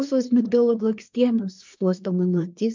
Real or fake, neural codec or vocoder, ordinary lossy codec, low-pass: fake; codec, 24 kHz, 1 kbps, SNAC; MP3, 64 kbps; 7.2 kHz